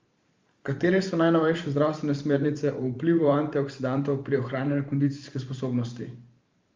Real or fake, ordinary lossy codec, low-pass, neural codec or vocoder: fake; Opus, 32 kbps; 7.2 kHz; vocoder, 44.1 kHz, 128 mel bands every 512 samples, BigVGAN v2